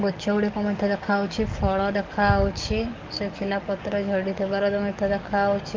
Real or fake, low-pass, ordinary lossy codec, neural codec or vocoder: fake; 7.2 kHz; Opus, 24 kbps; codec, 44.1 kHz, 7.8 kbps, DAC